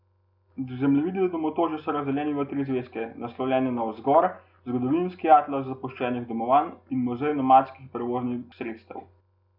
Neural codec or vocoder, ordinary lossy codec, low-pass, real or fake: none; none; 5.4 kHz; real